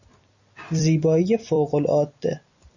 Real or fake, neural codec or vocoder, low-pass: fake; vocoder, 44.1 kHz, 128 mel bands every 256 samples, BigVGAN v2; 7.2 kHz